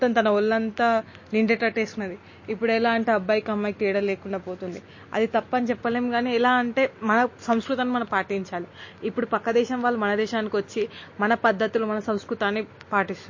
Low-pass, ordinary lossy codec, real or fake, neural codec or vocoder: 7.2 kHz; MP3, 32 kbps; real; none